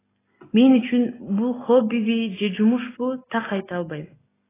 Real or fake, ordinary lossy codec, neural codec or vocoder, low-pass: real; AAC, 16 kbps; none; 3.6 kHz